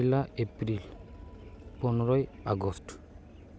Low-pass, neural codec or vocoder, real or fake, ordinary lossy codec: none; none; real; none